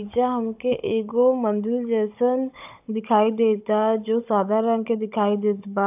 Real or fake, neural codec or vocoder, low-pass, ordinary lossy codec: fake; codec, 16 kHz, 16 kbps, FunCodec, trained on Chinese and English, 50 frames a second; 3.6 kHz; AAC, 32 kbps